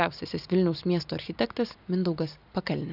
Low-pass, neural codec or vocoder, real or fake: 5.4 kHz; none; real